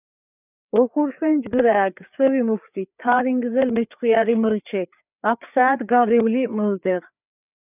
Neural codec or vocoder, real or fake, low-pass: codec, 16 kHz, 4 kbps, FreqCodec, larger model; fake; 3.6 kHz